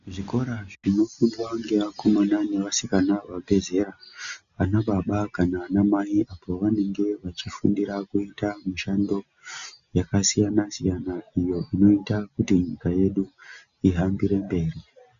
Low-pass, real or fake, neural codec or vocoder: 7.2 kHz; real; none